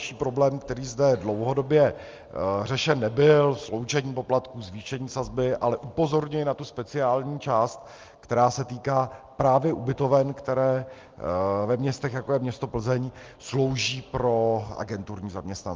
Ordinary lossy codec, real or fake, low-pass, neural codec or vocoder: Opus, 32 kbps; real; 7.2 kHz; none